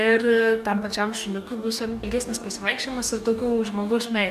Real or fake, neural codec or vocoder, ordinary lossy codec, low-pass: fake; codec, 44.1 kHz, 2.6 kbps, DAC; MP3, 96 kbps; 14.4 kHz